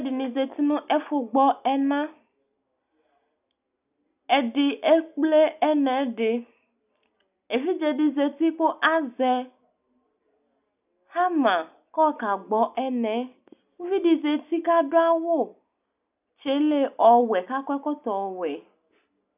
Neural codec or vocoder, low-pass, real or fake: none; 3.6 kHz; real